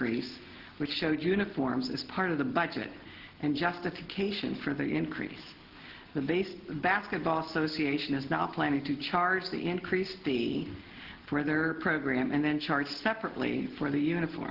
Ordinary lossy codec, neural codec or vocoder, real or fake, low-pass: Opus, 16 kbps; none; real; 5.4 kHz